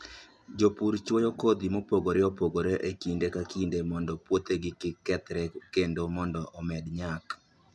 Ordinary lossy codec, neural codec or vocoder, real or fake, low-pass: none; none; real; none